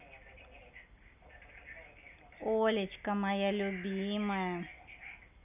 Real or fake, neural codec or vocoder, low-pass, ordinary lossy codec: real; none; 3.6 kHz; none